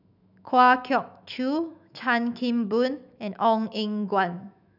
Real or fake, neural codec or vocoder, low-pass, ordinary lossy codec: fake; autoencoder, 48 kHz, 128 numbers a frame, DAC-VAE, trained on Japanese speech; 5.4 kHz; none